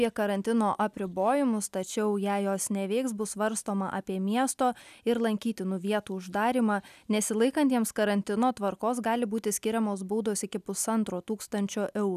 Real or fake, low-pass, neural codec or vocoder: real; 14.4 kHz; none